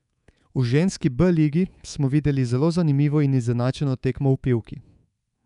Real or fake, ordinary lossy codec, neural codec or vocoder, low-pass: fake; none; codec, 24 kHz, 3.1 kbps, DualCodec; 10.8 kHz